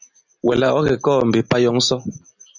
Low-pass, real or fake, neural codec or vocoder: 7.2 kHz; real; none